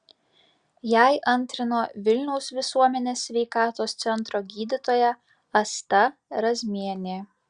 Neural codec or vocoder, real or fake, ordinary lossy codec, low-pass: none; real; Opus, 64 kbps; 9.9 kHz